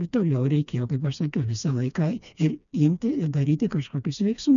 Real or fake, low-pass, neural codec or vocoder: fake; 7.2 kHz; codec, 16 kHz, 2 kbps, FreqCodec, smaller model